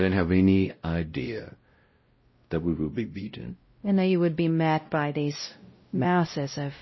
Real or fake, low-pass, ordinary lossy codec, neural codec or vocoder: fake; 7.2 kHz; MP3, 24 kbps; codec, 16 kHz, 0.5 kbps, X-Codec, WavLM features, trained on Multilingual LibriSpeech